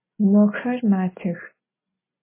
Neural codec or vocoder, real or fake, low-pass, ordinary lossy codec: none; real; 3.6 kHz; MP3, 16 kbps